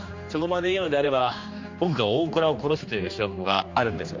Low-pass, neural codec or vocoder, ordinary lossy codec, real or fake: 7.2 kHz; codec, 16 kHz, 2 kbps, X-Codec, HuBERT features, trained on general audio; MP3, 48 kbps; fake